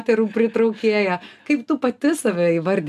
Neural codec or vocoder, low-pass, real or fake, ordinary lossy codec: none; 14.4 kHz; real; AAC, 96 kbps